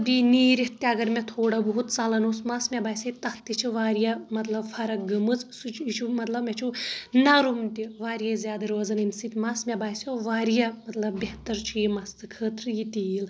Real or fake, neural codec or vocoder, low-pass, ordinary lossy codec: real; none; none; none